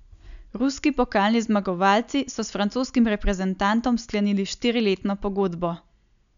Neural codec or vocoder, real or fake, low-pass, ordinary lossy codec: none; real; 7.2 kHz; none